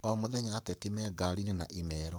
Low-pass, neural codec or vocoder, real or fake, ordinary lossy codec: none; codec, 44.1 kHz, 7.8 kbps, Pupu-Codec; fake; none